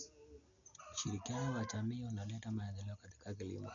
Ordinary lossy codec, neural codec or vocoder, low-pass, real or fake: none; none; 7.2 kHz; real